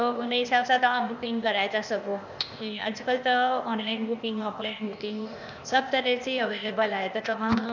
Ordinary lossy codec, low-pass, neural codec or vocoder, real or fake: none; 7.2 kHz; codec, 16 kHz, 0.8 kbps, ZipCodec; fake